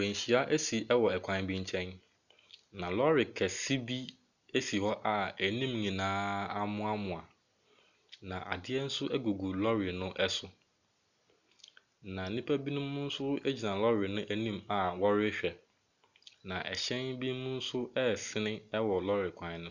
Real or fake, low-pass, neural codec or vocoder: real; 7.2 kHz; none